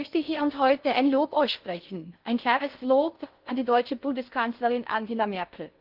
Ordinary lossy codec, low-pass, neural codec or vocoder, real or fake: Opus, 32 kbps; 5.4 kHz; codec, 16 kHz in and 24 kHz out, 0.6 kbps, FocalCodec, streaming, 4096 codes; fake